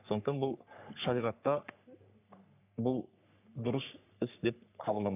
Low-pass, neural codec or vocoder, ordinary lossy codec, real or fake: 3.6 kHz; codec, 44.1 kHz, 3.4 kbps, Pupu-Codec; none; fake